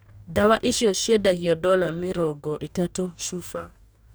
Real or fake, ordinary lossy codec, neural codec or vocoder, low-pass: fake; none; codec, 44.1 kHz, 2.6 kbps, DAC; none